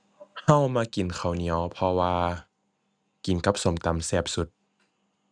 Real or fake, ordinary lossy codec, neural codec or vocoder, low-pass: real; none; none; 9.9 kHz